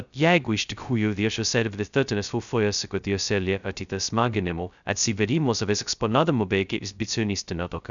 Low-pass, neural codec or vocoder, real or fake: 7.2 kHz; codec, 16 kHz, 0.2 kbps, FocalCodec; fake